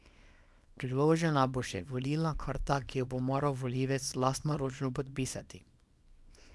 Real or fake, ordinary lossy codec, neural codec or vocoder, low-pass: fake; none; codec, 24 kHz, 0.9 kbps, WavTokenizer, small release; none